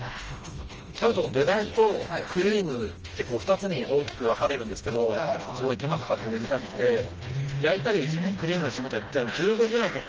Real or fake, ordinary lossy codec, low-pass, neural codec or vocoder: fake; Opus, 24 kbps; 7.2 kHz; codec, 16 kHz, 1 kbps, FreqCodec, smaller model